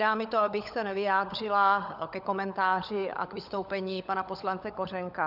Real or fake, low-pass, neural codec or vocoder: fake; 5.4 kHz; codec, 16 kHz, 16 kbps, FunCodec, trained on LibriTTS, 50 frames a second